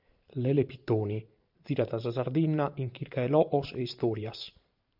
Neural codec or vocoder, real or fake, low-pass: vocoder, 44.1 kHz, 128 mel bands every 512 samples, BigVGAN v2; fake; 5.4 kHz